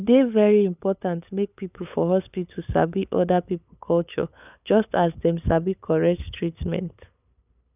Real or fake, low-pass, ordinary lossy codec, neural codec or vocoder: fake; 3.6 kHz; none; codec, 16 kHz, 8 kbps, FunCodec, trained on LibriTTS, 25 frames a second